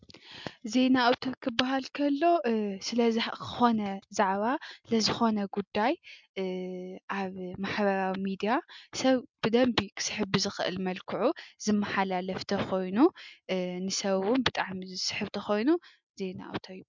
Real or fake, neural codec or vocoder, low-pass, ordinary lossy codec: real; none; 7.2 kHz; MP3, 64 kbps